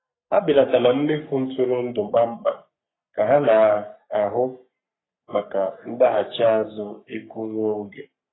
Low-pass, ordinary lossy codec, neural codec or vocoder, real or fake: 7.2 kHz; AAC, 16 kbps; codec, 44.1 kHz, 3.4 kbps, Pupu-Codec; fake